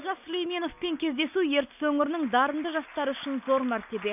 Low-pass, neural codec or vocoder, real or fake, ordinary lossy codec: 3.6 kHz; none; real; none